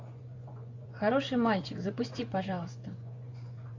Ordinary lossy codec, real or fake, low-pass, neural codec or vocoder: AAC, 48 kbps; fake; 7.2 kHz; vocoder, 24 kHz, 100 mel bands, Vocos